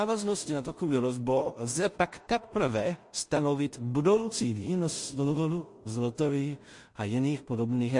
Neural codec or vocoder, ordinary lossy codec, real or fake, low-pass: codec, 16 kHz in and 24 kHz out, 0.4 kbps, LongCat-Audio-Codec, two codebook decoder; MP3, 48 kbps; fake; 10.8 kHz